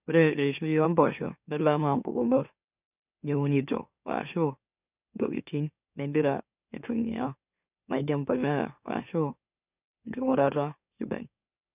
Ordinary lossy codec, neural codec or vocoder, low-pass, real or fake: none; autoencoder, 44.1 kHz, a latent of 192 numbers a frame, MeloTTS; 3.6 kHz; fake